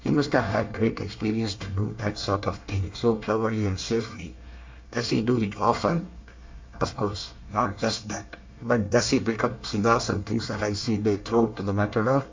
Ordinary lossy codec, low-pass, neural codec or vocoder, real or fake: MP3, 64 kbps; 7.2 kHz; codec, 24 kHz, 1 kbps, SNAC; fake